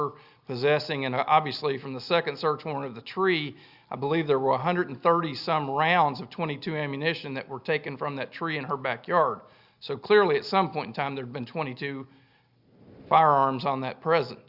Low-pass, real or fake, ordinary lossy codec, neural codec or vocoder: 5.4 kHz; real; Opus, 64 kbps; none